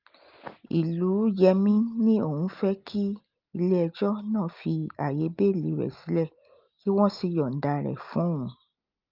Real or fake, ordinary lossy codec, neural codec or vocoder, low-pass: real; Opus, 32 kbps; none; 5.4 kHz